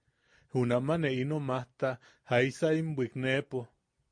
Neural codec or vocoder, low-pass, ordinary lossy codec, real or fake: none; 9.9 kHz; MP3, 48 kbps; real